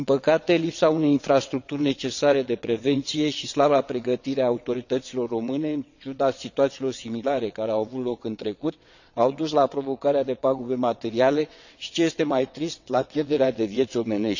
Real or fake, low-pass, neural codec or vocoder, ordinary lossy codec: fake; 7.2 kHz; vocoder, 22.05 kHz, 80 mel bands, WaveNeXt; none